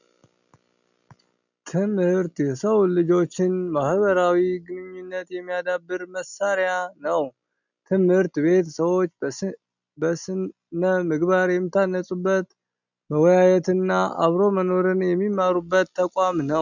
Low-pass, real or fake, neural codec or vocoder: 7.2 kHz; real; none